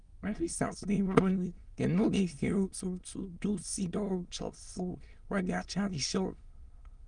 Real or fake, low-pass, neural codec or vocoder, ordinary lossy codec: fake; 9.9 kHz; autoencoder, 22.05 kHz, a latent of 192 numbers a frame, VITS, trained on many speakers; Opus, 32 kbps